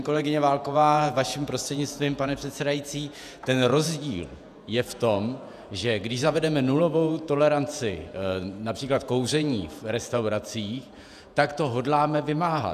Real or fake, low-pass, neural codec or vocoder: fake; 14.4 kHz; vocoder, 48 kHz, 128 mel bands, Vocos